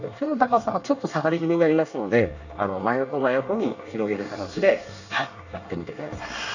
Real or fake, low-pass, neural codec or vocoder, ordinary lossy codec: fake; 7.2 kHz; codec, 24 kHz, 1 kbps, SNAC; none